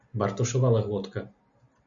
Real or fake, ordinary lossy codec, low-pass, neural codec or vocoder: real; MP3, 64 kbps; 7.2 kHz; none